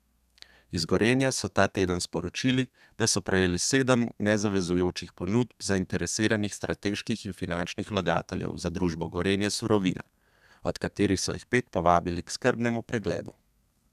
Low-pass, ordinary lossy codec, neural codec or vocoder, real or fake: 14.4 kHz; none; codec, 32 kHz, 1.9 kbps, SNAC; fake